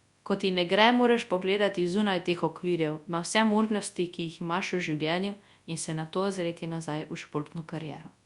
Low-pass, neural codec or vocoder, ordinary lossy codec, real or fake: 10.8 kHz; codec, 24 kHz, 0.9 kbps, WavTokenizer, large speech release; Opus, 64 kbps; fake